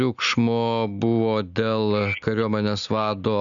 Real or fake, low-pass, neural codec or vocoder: real; 7.2 kHz; none